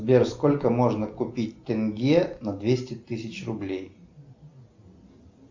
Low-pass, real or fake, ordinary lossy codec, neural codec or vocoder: 7.2 kHz; real; MP3, 64 kbps; none